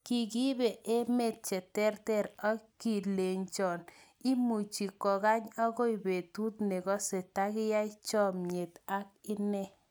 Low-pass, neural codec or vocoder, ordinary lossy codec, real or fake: none; none; none; real